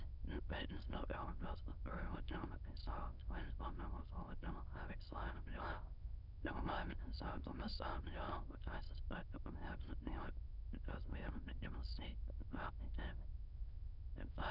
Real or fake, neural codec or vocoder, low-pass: fake; autoencoder, 22.05 kHz, a latent of 192 numbers a frame, VITS, trained on many speakers; 5.4 kHz